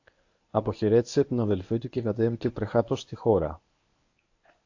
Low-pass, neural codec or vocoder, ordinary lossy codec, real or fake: 7.2 kHz; codec, 24 kHz, 0.9 kbps, WavTokenizer, medium speech release version 1; AAC, 48 kbps; fake